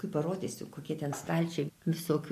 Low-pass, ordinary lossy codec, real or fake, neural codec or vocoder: 14.4 kHz; MP3, 64 kbps; real; none